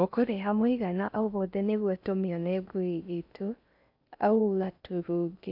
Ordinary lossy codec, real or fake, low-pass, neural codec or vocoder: AAC, 48 kbps; fake; 5.4 kHz; codec, 16 kHz in and 24 kHz out, 0.6 kbps, FocalCodec, streaming, 4096 codes